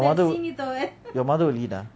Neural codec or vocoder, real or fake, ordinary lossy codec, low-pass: none; real; none; none